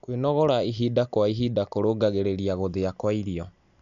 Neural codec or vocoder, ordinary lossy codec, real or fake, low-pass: none; none; real; 7.2 kHz